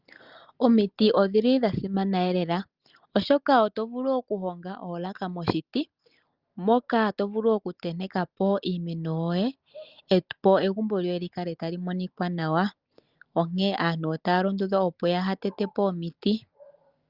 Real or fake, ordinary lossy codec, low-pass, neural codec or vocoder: real; Opus, 32 kbps; 5.4 kHz; none